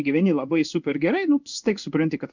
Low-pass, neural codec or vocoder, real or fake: 7.2 kHz; codec, 16 kHz in and 24 kHz out, 1 kbps, XY-Tokenizer; fake